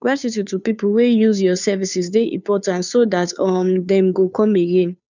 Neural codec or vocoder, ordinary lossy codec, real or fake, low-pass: codec, 16 kHz, 2 kbps, FunCodec, trained on Chinese and English, 25 frames a second; none; fake; 7.2 kHz